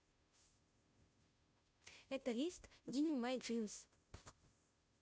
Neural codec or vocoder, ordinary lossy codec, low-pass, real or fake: codec, 16 kHz, 0.5 kbps, FunCodec, trained on Chinese and English, 25 frames a second; none; none; fake